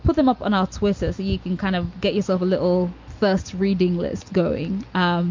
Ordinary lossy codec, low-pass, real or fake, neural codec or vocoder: MP3, 48 kbps; 7.2 kHz; real; none